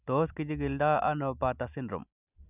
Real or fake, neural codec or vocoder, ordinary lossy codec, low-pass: real; none; none; 3.6 kHz